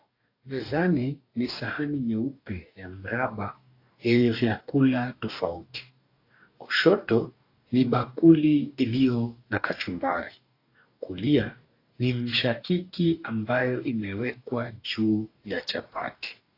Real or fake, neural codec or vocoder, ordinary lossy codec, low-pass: fake; codec, 44.1 kHz, 2.6 kbps, DAC; AAC, 32 kbps; 5.4 kHz